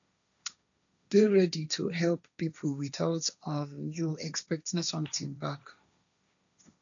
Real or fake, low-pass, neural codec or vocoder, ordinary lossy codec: fake; 7.2 kHz; codec, 16 kHz, 1.1 kbps, Voila-Tokenizer; none